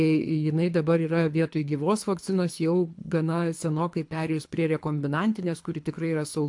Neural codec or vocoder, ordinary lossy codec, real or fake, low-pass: codec, 24 kHz, 3 kbps, HILCodec; AAC, 64 kbps; fake; 10.8 kHz